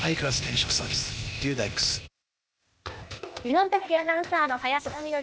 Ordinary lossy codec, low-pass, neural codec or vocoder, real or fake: none; none; codec, 16 kHz, 0.8 kbps, ZipCodec; fake